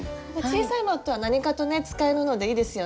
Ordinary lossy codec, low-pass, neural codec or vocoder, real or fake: none; none; none; real